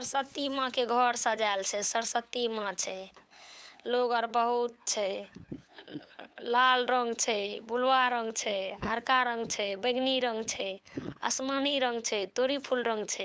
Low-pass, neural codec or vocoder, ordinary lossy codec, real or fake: none; codec, 16 kHz, 8 kbps, FunCodec, trained on LibriTTS, 25 frames a second; none; fake